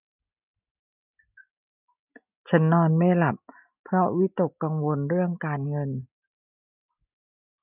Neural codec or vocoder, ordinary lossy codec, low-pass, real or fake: none; none; 3.6 kHz; real